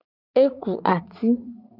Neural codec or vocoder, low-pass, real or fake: vocoder, 44.1 kHz, 80 mel bands, Vocos; 5.4 kHz; fake